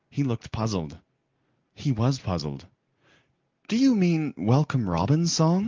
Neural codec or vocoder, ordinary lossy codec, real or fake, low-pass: none; Opus, 32 kbps; real; 7.2 kHz